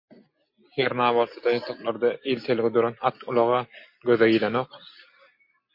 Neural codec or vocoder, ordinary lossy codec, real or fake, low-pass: none; MP3, 32 kbps; real; 5.4 kHz